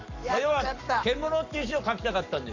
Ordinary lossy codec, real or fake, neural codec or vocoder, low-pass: none; fake; codec, 44.1 kHz, 7.8 kbps, Pupu-Codec; 7.2 kHz